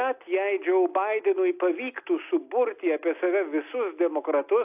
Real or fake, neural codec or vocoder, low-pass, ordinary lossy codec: real; none; 3.6 kHz; AAC, 32 kbps